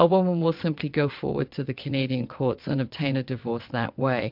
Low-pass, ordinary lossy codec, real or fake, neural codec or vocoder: 5.4 kHz; MP3, 48 kbps; fake; vocoder, 22.05 kHz, 80 mel bands, WaveNeXt